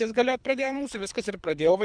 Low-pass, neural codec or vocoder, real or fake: 9.9 kHz; codec, 24 kHz, 3 kbps, HILCodec; fake